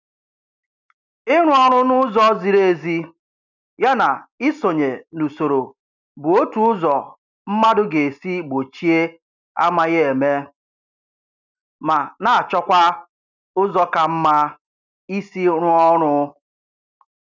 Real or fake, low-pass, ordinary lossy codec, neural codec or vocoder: real; 7.2 kHz; none; none